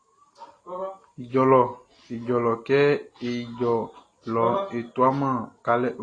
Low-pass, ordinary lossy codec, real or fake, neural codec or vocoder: 9.9 kHz; AAC, 32 kbps; real; none